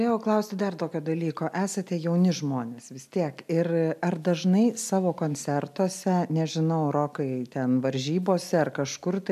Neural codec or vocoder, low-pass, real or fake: none; 14.4 kHz; real